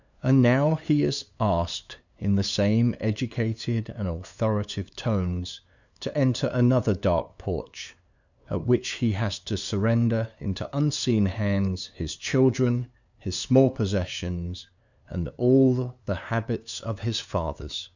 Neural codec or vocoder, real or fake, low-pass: codec, 16 kHz, 2 kbps, FunCodec, trained on LibriTTS, 25 frames a second; fake; 7.2 kHz